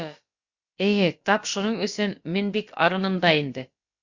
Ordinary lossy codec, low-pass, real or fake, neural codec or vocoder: Opus, 64 kbps; 7.2 kHz; fake; codec, 16 kHz, about 1 kbps, DyCAST, with the encoder's durations